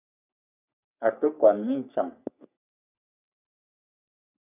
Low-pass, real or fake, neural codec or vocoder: 3.6 kHz; fake; codec, 44.1 kHz, 3.4 kbps, Pupu-Codec